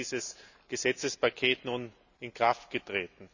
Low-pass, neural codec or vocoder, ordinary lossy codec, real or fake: 7.2 kHz; none; none; real